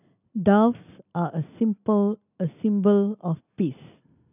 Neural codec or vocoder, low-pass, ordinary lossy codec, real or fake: none; 3.6 kHz; none; real